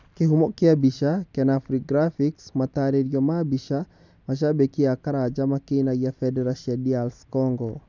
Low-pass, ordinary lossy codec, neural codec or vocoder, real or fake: 7.2 kHz; none; none; real